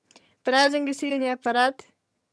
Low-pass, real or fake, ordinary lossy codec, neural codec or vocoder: none; fake; none; vocoder, 22.05 kHz, 80 mel bands, HiFi-GAN